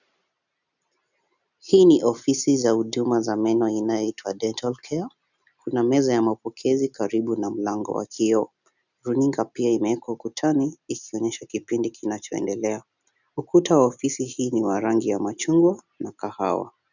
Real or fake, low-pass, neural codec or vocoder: real; 7.2 kHz; none